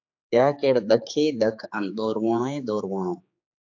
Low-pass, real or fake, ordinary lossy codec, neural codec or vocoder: 7.2 kHz; fake; AAC, 48 kbps; codec, 16 kHz, 4 kbps, X-Codec, HuBERT features, trained on general audio